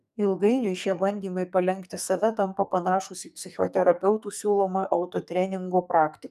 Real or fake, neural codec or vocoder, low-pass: fake; codec, 44.1 kHz, 2.6 kbps, SNAC; 14.4 kHz